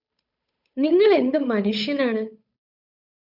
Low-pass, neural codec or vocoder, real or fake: 5.4 kHz; codec, 16 kHz, 8 kbps, FunCodec, trained on Chinese and English, 25 frames a second; fake